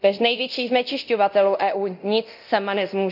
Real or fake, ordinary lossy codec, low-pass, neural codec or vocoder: fake; none; 5.4 kHz; codec, 24 kHz, 0.9 kbps, DualCodec